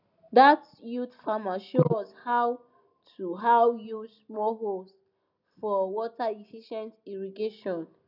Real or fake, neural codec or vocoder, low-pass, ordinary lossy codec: real; none; 5.4 kHz; none